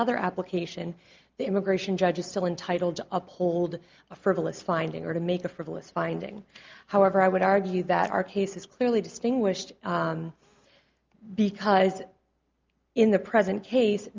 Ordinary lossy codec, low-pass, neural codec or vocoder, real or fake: Opus, 24 kbps; 7.2 kHz; none; real